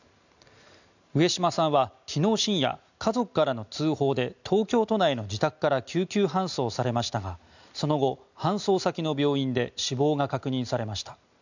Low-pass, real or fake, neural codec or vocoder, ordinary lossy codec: 7.2 kHz; real; none; none